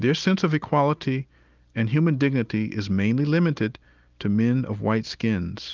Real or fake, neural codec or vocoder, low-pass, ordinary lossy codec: real; none; 7.2 kHz; Opus, 24 kbps